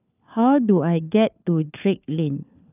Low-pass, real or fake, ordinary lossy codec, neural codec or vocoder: 3.6 kHz; fake; none; codec, 16 kHz, 4 kbps, FunCodec, trained on LibriTTS, 50 frames a second